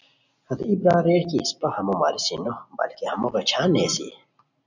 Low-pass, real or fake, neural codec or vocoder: 7.2 kHz; real; none